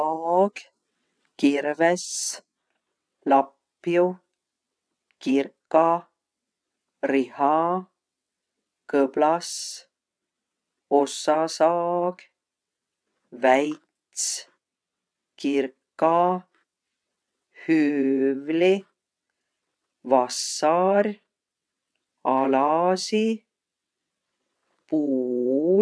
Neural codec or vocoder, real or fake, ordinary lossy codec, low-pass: vocoder, 22.05 kHz, 80 mel bands, WaveNeXt; fake; none; none